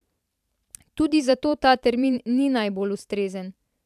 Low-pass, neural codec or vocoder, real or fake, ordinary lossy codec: 14.4 kHz; vocoder, 44.1 kHz, 128 mel bands every 512 samples, BigVGAN v2; fake; AAC, 96 kbps